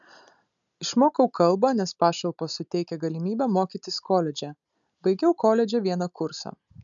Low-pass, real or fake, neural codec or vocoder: 7.2 kHz; real; none